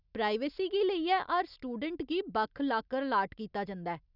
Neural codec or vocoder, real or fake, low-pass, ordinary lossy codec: none; real; 5.4 kHz; none